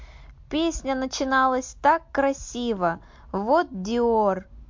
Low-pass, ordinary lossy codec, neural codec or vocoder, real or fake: 7.2 kHz; MP3, 48 kbps; none; real